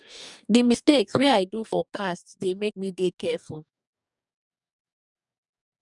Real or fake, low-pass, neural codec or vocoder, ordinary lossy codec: fake; 10.8 kHz; codec, 44.1 kHz, 2.6 kbps, DAC; none